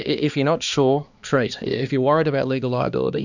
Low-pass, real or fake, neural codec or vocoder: 7.2 kHz; fake; codec, 16 kHz, 2 kbps, X-Codec, HuBERT features, trained on balanced general audio